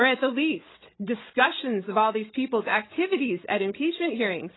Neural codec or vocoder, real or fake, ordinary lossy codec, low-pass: codec, 16 kHz, 16 kbps, FreqCodec, larger model; fake; AAC, 16 kbps; 7.2 kHz